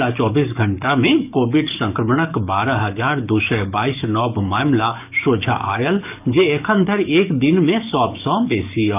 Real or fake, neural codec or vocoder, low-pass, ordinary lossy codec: fake; codec, 44.1 kHz, 7.8 kbps, DAC; 3.6 kHz; none